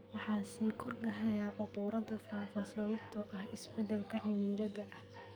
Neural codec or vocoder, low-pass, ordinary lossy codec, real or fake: codec, 44.1 kHz, 2.6 kbps, SNAC; none; none; fake